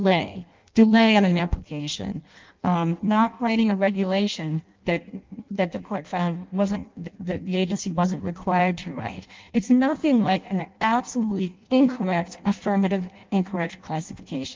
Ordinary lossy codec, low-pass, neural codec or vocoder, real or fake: Opus, 32 kbps; 7.2 kHz; codec, 16 kHz in and 24 kHz out, 0.6 kbps, FireRedTTS-2 codec; fake